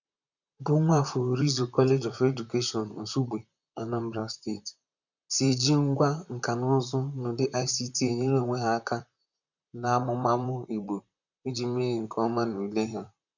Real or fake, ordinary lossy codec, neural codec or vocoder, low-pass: fake; none; vocoder, 44.1 kHz, 128 mel bands, Pupu-Vocoder; 7.2 kHz